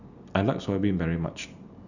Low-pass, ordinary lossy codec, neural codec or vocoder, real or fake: 7.2 kHz; none; none; real